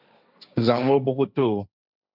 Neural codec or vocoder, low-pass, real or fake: codec, 16 kHz, 1.1 kbps, Voila-Tokenizer; 5.4 kHz; fake